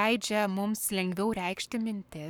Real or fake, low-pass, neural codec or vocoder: fake; 19.8 kHz; codec, 44.1 kHz, 7.8 kbps, Pupu-Codec